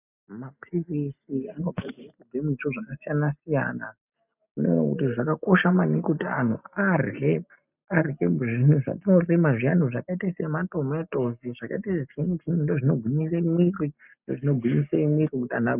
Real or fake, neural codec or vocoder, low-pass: real; none; 3.6 kHz